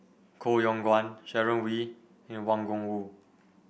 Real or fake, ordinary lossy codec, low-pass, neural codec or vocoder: real; none; none; none